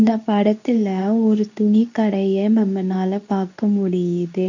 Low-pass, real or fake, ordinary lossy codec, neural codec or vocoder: 7.2 kHz; fake; none; codec, 24 kHz, 0.9 kbps, WavTokenizer, medium speech release version 2